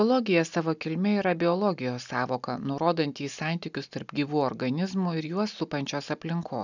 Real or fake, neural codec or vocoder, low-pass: real; none; 7.2 kHz